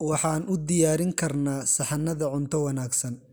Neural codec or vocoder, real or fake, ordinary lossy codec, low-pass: none; real; none; none